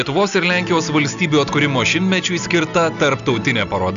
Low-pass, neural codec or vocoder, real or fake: 7.2 kHz; none; real